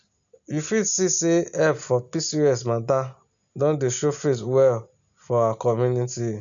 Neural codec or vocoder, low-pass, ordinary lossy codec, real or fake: none; 7.2 kHz; none; real